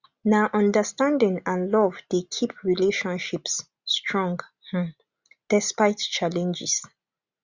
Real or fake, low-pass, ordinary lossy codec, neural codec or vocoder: real; 7.2 kHz; Opus, 64 kbps; none